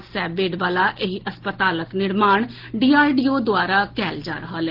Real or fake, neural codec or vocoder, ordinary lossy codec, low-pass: real; none; Opus, 16 kbps; 5.4 kHz